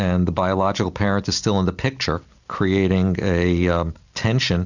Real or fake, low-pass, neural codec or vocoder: real; 7.2 kHz; none